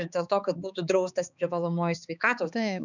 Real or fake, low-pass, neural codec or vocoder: fake; 7.2 kHz; codec, 16 kHz, 4 kbps, X-Codec, HuBERT features, trained on balanced general audio